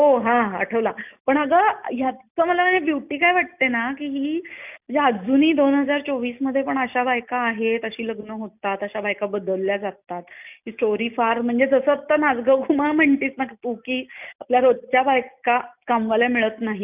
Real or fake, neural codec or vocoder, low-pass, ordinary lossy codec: real; none; 3.6 kHz; none